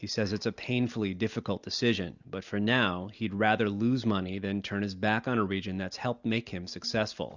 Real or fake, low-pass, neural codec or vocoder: real; 7.2 kHz; none